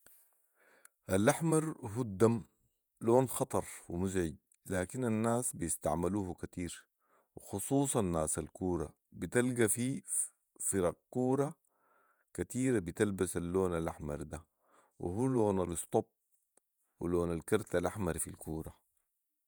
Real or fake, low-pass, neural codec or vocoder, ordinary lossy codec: fake; none; vocoder, 48 kHz, 128 mel bands, Vocos; none